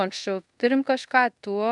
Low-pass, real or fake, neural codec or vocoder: 10.8 kHz; fake; codec, 24 kHz, 0.5 kbps, DualCodec